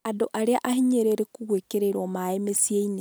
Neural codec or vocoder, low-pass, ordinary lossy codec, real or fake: none; none; none; real